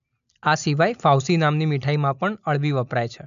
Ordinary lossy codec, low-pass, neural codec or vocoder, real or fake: none; 7.2 kHz; none; real